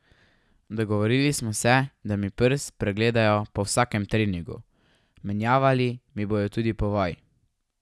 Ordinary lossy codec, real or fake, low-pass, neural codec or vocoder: none; real; none; none